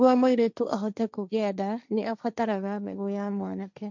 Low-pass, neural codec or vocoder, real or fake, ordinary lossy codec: 7.2 kHz; codec, 16 kHz, 1.1 kbps, Voila-Tokenizer; fake; none